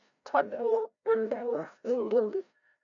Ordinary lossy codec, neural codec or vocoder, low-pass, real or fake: none; codec, 16 kHz, 0.5 kbps, FreqCodec, larger model; 7.2 kHz; fake